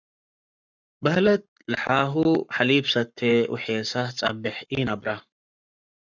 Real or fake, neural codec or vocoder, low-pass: fake; codec, 44.1 kHz, 7.8 kbps, Pupu-Codec; 7.2 kHz